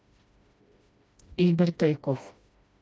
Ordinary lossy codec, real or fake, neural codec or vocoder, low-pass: none; fake; codec, 16 kHz, 1 kbps, FreqCodec, smaller model; none